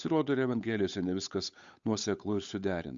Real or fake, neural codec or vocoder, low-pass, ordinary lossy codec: fake; codec, 16 kHz, 8 kbps, FunCodec, trained on Chinese and English, 25 frames a second; 7.2 kHz; Opus, 64 kbps